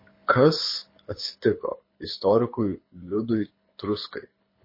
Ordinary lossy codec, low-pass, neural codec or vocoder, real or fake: MP3, 24 kbps; 5.4 kHz; codec, 24 kHz, 6 kbps, HILCodec; fake